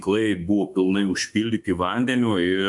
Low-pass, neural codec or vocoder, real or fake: 10.8 kHz; autoencoder, 48 kHz, 32 numbers a frame, DAC-VAE, trained on Japanese speech; fake